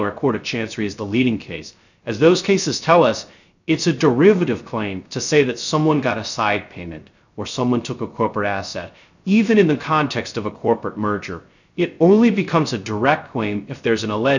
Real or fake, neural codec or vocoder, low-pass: fake; codec, 16 kHz, 0.3 kbps, FocalCodec; 7.2 kHz